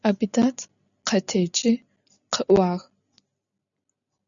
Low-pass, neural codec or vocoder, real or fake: 7.2 kHz; none; real